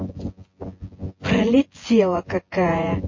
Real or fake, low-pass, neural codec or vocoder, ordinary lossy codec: fake; 7.2 kHz; vocoder, 24 kHz, 100 mel bands, Vocos; MP3, 32 kbps